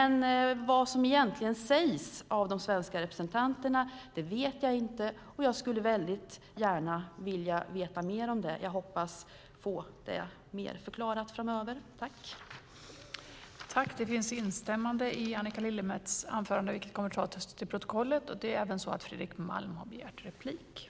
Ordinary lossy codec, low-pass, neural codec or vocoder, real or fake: none; none; none; real